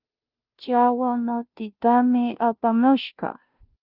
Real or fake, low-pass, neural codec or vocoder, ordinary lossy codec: fake; 5.4 kHz; codec, 16 kHz, 0.5 kbps, FunCodec, trained on Chinese and English, 25 frames a second; Opus, 32 kbps